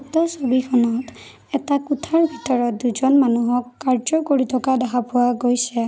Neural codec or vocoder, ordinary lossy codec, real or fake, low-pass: none; none; real; none